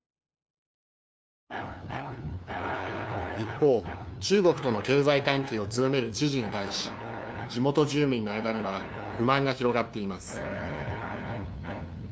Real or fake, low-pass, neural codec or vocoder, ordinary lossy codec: fake; none; codec, 16 kHz, 2 kbps, FunCodec, trained on LibriTTS, 25 frames a second; none